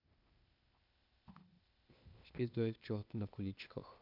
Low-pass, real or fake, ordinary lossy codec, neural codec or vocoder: 5.4 kHz; fake; none; codec, 16 kHz, 0.8 kbps, ZipCodec